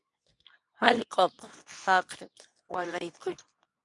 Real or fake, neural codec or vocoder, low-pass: fake; codec, 24 kHz, 0.9 kbps, WavTokenizer, medium speech release version 2; 10.8 kHz